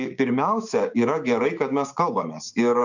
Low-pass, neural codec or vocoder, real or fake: 7.2 kHz; codec, 16 kHz, 6 kbps, DAC; fake